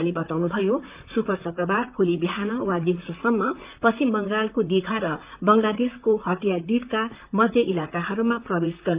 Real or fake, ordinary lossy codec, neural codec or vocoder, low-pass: fake; Opus, 24 kbps; vocoder, 44.1 kHz, 128 mel bands, Pupu-Vocoder; 3.6 kHz